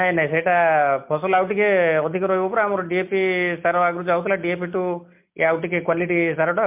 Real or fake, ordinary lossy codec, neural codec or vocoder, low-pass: real; MP3, 32 kbps; none; 3.6 kHz